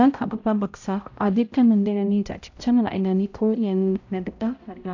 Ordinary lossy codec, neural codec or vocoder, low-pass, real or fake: AAC, 48 kbps; codec, 16 kHz, 0.5 kbps, X-Codec, HuBERT features, trained on balanced general audio; 7.2 kHz; fake